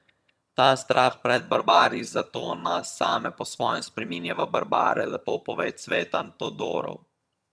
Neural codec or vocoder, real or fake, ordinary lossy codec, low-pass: vocoder, 22.05 kHz, 80 mel bands, HiFi-GAN; fake; none; none